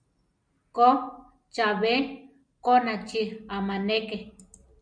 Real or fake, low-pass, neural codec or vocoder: real; 9.9 kHz; none